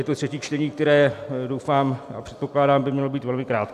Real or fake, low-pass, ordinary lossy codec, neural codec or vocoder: real; 14.4 kHz; AAC, 96 kbps; none